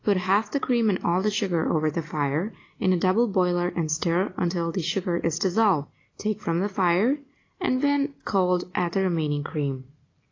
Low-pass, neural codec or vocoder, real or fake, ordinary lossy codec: 7.2 kHz; none; real; AAC, 32 kbps